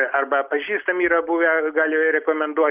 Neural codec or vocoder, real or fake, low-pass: none; real; 3.6 kHz